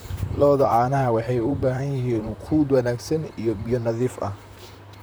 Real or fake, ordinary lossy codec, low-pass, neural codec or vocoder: fake; none; none; vocoder, 44.1 kHz, 128 mel bands, Pupu-Vocoder